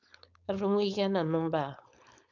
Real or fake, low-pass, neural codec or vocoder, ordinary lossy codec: fake; 7.2 kHz; codec, 16 kHz, 4.8 kbps, FACodec; none